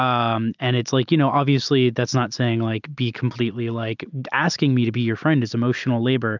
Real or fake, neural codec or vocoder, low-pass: real; none; 7.2 kHz